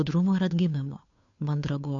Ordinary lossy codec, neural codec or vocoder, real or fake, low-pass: MP3, 64 kbps; codec, 16 kHz, 2 kbps, FunCodec, trained on Chinese and English, 25 frames a second; fake; 7.2 kHz